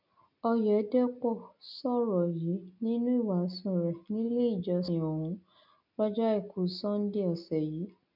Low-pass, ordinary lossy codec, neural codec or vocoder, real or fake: 5.4 kHz; none; none; real